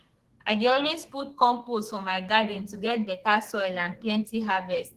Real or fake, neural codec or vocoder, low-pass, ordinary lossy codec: fake; codec, 32 kHz, 1.9 kbps, SNAC; 14.4 kHz; Opus, 16 kbps